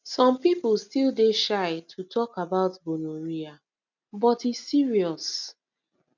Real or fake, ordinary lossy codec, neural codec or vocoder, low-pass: real; none; none; 7.2 kHz